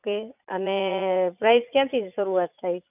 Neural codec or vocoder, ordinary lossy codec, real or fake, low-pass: vocoder, 44.1 kHz, 80 mel bands, Vocos; none; fake; 3.6 kHz